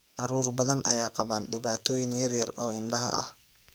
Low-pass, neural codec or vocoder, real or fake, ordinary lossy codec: none; codec, 44.1 kHz, 2.6 kbps, SNAC; fake; none